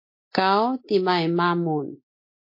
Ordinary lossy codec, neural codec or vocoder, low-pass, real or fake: MP3, 32 kbps; none; 5.4 kHz; real